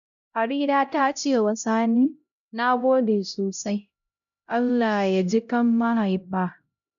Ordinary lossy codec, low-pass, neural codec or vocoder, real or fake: none; 7.2 kHz; codec, 16 kHz, 0.5 kbps, X-Codec, HuBERT features, trained on LibriSpeech; fake